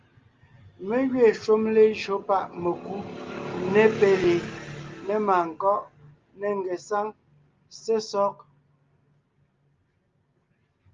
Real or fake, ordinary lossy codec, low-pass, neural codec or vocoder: real; Opus, 32 kbps; 7.2 kHz; none